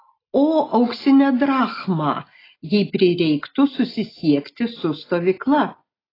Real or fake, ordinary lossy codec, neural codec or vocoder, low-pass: real; AAC, 24 kbps; none; 5.4 kHz